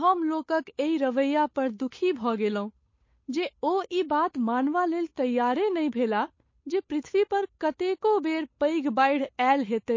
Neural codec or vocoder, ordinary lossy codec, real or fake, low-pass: codec, 16 kHz, 8 kbps, FunCodec, trained on Chinese and English, 25 frames a second; MP3, 32 kbps; fake; 7.2 kHz